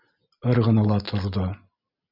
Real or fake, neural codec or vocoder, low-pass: real; none; 5.4 kHz